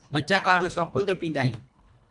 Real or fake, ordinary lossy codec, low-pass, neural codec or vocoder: fake; MP3, 96 kbps; 10.8 kHz; codec, 24 kHz, 1.5 kbps, HILCodec